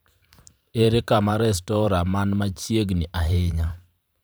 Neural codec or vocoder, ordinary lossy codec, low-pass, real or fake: vocoder, 44.1 kHz, 128 mel bands every 512 samples, BigVGAN v2; none; none; fake